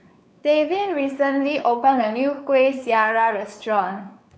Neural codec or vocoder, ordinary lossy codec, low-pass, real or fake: codec, 16 kHz, 4 kbps, X-Codec, WavLM features, trained on Multilingual LibriSpeech; none; none; fake